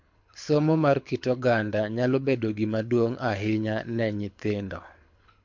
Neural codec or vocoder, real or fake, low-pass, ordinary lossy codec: codec, 24 kHz, 6 kbps, HILCodec; fake; 7.2 kHz; MP3, 48 kbps